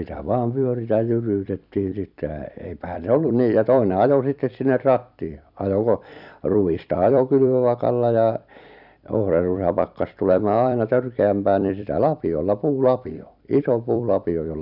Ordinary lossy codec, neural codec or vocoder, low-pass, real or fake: none; vocoder, 44.1 kHz, 128 mel bands every 256 samples, BigVGAN v2; 5.4 kHz; fake